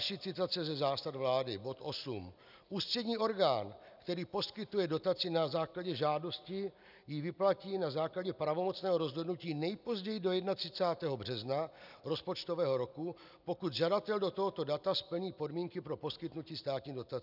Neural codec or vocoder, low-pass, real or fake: none; 5.4 kHz; real